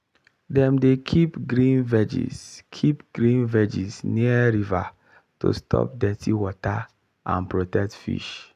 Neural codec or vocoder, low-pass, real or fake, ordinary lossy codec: none; 14.4 kHz; real; none